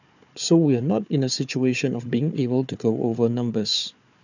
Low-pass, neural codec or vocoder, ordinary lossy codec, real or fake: 7.2 kHz; codec, 16 kHz, 4 kbps, FunCodec, trained on Chinese and English, 50 frames a second; none; fake